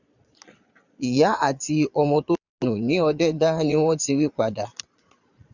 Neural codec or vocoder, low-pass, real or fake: vocoder, 22.05 kHz, 80 mel bands, Vocos; 7.2 kHz; fake